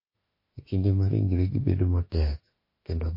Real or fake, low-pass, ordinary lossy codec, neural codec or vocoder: fake; 5.4 kHz; MP3, 24 kbps; autoencoder, 48 kHz, 32 numbers a frame, DAC-VAE, trained on Japanese speech